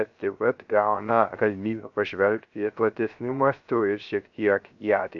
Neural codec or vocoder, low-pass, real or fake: codec, 16 kHz, 0.3 kbps, FocalCodec; 7.2 kHz; fake